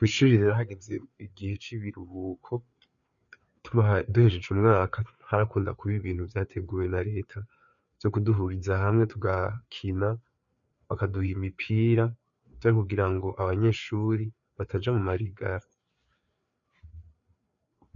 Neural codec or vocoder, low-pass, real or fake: codec, 16 kHz, 4 kbps, FreqCodec, larger model; 7.2 kHz; fake